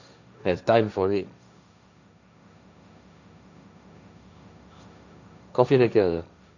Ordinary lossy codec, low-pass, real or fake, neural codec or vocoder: none; 7.2 kHz; fake; codec, 16 kHz, 1.1 kbps, Voila-Tokenizer